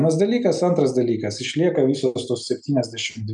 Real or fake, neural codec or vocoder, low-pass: real; none; 10.8 kHz